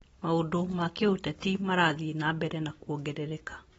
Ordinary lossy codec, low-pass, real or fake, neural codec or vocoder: AAC, 24 kbps; 19.8 kHz; real; none